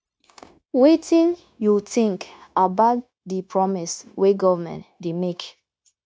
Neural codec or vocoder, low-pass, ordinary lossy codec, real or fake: codec, 16 kHz, 0.9 kbps, LongCat-Audio-Codec; none; none; fake